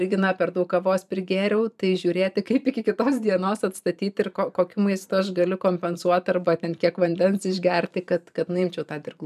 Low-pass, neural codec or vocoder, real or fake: 14.4 kHz; vocoder, 44.1 kHz, 128 mel bands every 512 samples, BigVGAN v2; fake